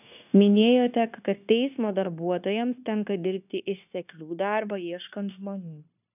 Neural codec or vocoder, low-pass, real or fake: codec, 16 kHz, 0.9 kbps, LongCat-Audio-Codec; 3.6 kHz; fake